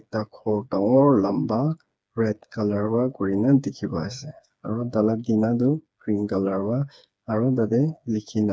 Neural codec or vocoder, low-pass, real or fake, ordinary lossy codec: codec, 16 kHz, 4 kbps, FreqCodec, smaller model; none; fake; none